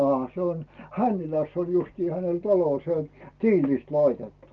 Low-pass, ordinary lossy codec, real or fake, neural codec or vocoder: 7.2 kHz; Opus, 24 kbps; real; none